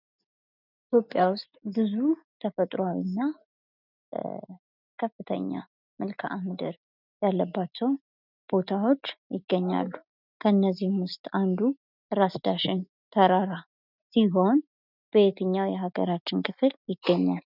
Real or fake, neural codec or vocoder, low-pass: real; none; 5.4 kHz